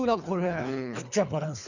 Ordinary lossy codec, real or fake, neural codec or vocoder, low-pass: none; fake; codec, 24 kHz, 3 kbps, HILCodec; 7.2 kHz